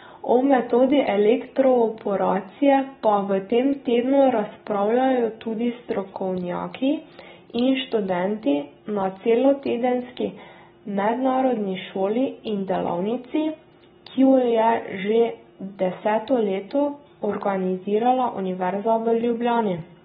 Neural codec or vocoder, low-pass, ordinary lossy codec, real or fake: none; 19.8 kHz; AAC, 16 kbps; real